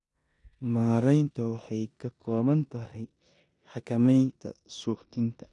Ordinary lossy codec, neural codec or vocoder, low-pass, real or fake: none; codec, 16 kHz in and 24 kHz out, 0.9 kbps, LongCat-Audio-Codec, four codebook decoder; 10.8 kHz; fake